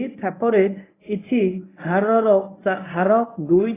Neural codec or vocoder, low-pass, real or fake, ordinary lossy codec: codec, 24 kHz, 0.9 kbps, WavTokenizer, medium speech release version 1; 3.6 kHz; fake; AAC, 16 kbps